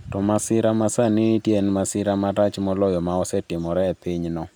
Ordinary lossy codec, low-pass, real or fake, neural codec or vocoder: none; none; real; none